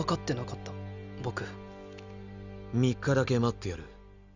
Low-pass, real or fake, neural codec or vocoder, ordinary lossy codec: 7.2 kHz; real; none; none